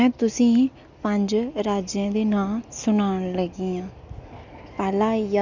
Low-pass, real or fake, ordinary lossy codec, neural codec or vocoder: 7.2 kHz; real; none; none